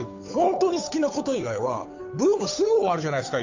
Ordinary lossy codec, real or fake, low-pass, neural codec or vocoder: AAC, 48 kbps; fake; 7.2 kHz; codec, 16 kHz, 8 kbps, FunCodec, trained on Chinese and English, 25 frames a second